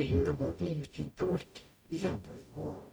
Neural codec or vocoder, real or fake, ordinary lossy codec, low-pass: codec, 44.1 kHz, 0.9 kbps, DAC; fake; none; none